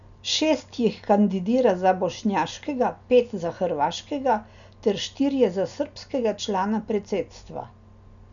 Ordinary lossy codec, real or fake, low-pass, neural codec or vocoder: none; real; 7.2 kHz; none